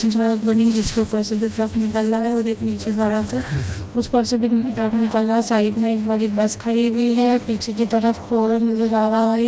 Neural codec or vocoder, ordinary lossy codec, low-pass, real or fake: codec, 16 kHz, 1 kbps, FreqCodec, smaller model; none; none; fake